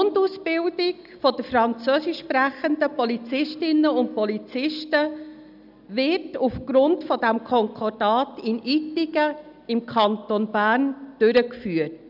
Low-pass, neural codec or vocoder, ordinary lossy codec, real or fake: 5.4 kHz; none; none; real